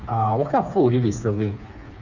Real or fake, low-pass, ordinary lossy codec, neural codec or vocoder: fake; 7.2 kHz; none; codec, 16 kHz, 4 kbps, FreqCodec, smaller model